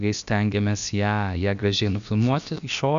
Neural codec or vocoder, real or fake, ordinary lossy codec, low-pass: codec, 16 kHz, about 1 kbps, DyCAST, with the encoder's durations; fake; AAC, 96 kbps; 7.2 kHz